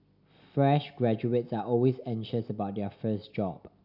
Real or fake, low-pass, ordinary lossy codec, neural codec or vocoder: real; 5.4 kHz; none; none